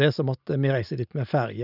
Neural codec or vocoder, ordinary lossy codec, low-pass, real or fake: none; none; 5.4 kHz; real